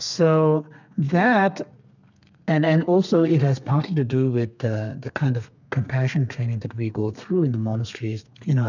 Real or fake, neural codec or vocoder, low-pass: fake; codec, 32 kHz, 1.9 kbps, SNAC; 7.2 kHz